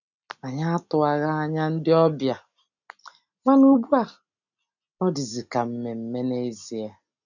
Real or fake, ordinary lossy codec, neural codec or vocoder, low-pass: real; none; none; 7.2 kHz